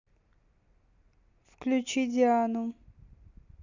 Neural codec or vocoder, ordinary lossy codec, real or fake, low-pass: none; none; real; 7.2 kHz